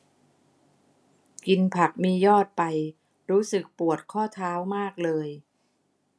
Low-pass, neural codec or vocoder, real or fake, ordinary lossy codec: none; none; real; none